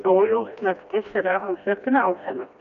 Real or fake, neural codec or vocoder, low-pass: fake; codec, 16 kHz, 1 kbps, FreqCodec, smaller model; 7.2 kHz